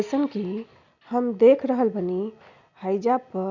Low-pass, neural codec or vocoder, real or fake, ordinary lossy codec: 7.2 kHz; none; real; none